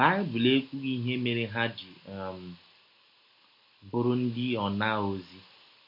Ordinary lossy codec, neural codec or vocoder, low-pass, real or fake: MP3, 32 kbps; none; 5.4 kHz; real